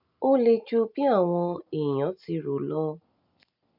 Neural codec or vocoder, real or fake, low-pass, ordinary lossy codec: none; real; 5.4 kHz; none